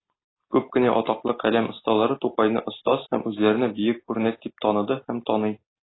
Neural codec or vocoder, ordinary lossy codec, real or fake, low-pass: none; AAC, 16 kbps; real; 7.2 kHz